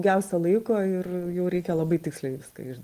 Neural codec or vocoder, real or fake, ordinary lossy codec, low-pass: none; real; Opus, 24 kbps; 14.4 kHz